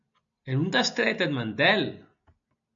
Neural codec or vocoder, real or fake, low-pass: none; real; 7.2 kHz